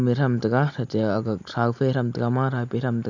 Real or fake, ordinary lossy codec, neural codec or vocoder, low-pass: real; none; none; 7.2 kHz